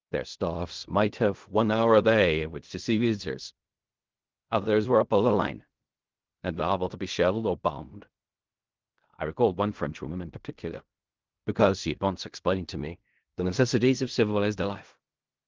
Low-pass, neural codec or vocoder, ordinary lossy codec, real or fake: 7.2 kHz; codec, 16 kHz in and 24 kHz out, 0.4 kbps, LongCat-Audio-Codec, fine tuned four codebook decoder; Opus, 32 kbps; fake